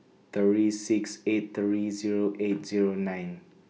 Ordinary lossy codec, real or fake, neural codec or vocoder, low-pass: none; real; none; none